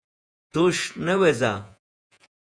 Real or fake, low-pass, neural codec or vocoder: fake; 9.9 kHz; vocoder, 48 kHz, 128 mel bands, Vocos